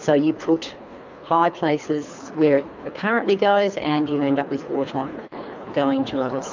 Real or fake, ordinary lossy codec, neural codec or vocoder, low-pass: fake; MP3, 64 kbps; codec, 24 kHz, 3 kbps, HILCodec; 7.2 kHz